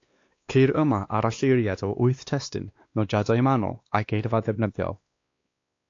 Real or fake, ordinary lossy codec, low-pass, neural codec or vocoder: fake; AAC, 48 kbps; 7.2 kHz; codec, 16 kHz, 2 kbps, X-Codec, WavLM features, trained on Multilingual LibriSpeech